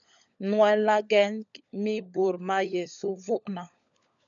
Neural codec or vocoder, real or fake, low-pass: codec, 16 kHz, 16 kbps, FunCodec, trained on LibriTTS, 50 frames a second; fake; 7.2 kHz